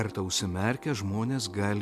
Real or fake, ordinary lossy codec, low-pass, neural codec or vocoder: real; MP3, 96 kbps; 14.4 kHz; none